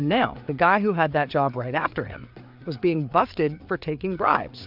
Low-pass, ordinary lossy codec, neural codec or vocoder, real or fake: 5.4 kHz; AAC, 48 kbps; codec, 16 kHz, 4 kbps, FreqCodec, larger model; fake